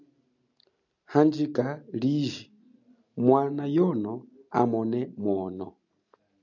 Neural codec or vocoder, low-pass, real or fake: none; 7.2 kHz; real